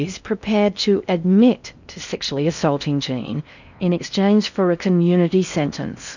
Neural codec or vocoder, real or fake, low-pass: codec, 16 kHz in and 24 kHz out, 0.6 kbps, FocalCodec, streaming, 4096 codes; fake; 7.2 kHz